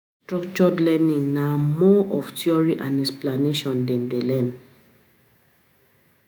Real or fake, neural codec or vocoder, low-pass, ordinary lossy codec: fake; autoencoder, 48 kHz, 128 numbers a frame, DAC-VAE, trained on Japanese speech; none; none